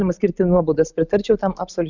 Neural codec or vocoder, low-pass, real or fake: none; 7.2 kHz; real